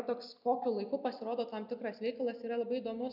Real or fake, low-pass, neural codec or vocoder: real; 5.4 kHz; none